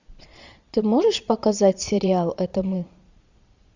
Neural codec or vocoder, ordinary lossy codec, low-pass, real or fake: vocoder, 22.05 kHz, 80 mel bands, Vocos; Opus, 64 kbps; 7.2 kHz; fake